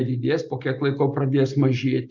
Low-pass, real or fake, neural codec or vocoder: 7.2 kHz; real; none